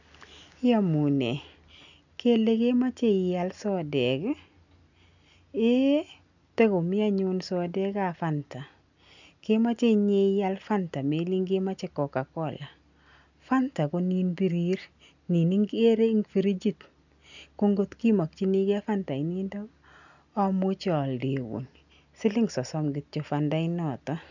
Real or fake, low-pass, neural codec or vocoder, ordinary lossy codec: real; 7.2 kHz; none; none